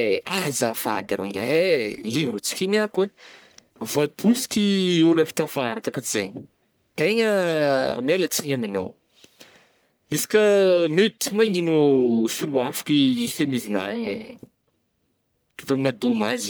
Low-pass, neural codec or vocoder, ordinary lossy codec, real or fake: none; codec, 44.1 kHz, 1.7 kbps, Pupu-Codec; none; fake